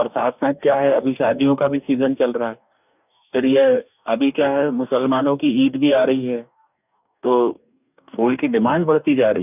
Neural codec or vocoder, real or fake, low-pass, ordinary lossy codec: codec, 44.1 kHz, 2.6 kbps, DAC; fake; 3.6 kHz; none